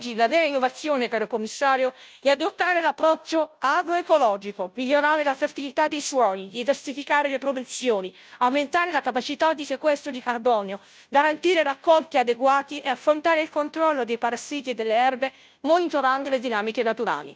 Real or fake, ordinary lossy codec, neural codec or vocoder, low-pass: fake; none; codec, 16 kHz, 0.5 kbps, FunCodec, trained on Chinese and English, 25 frames a second; none